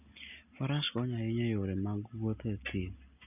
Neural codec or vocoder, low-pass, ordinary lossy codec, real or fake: none; 3.6 kHz; none; real